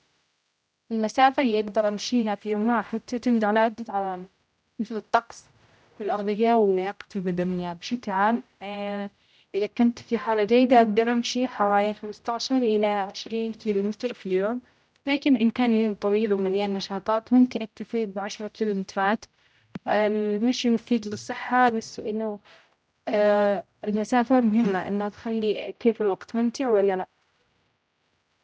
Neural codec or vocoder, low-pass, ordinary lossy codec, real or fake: codec, 16 kHz, 0.5 kbps, X-Codec, HuBERT features, trained on general audio; none; none; fake